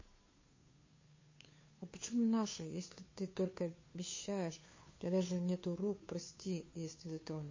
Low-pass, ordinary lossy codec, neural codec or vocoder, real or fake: 7.2 kHz; MP3, 32 kbps; codec, 16 kHz, 2 kbps, FunCodec, trained on Chinese and English, 25 frames a second; fake